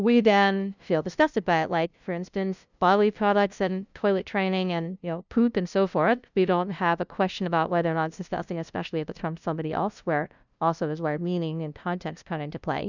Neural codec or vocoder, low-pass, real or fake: codec, 16 kHz, 0.5 kbps, FunCodec, trained on LibriTTS, 25 frames a second; 7.2 kHz; fake